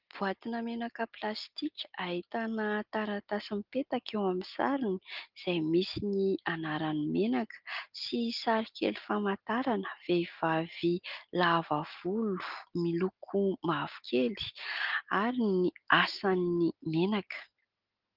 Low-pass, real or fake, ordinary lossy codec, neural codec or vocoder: 5.4 kHz; real; Opus, 32 kbps; none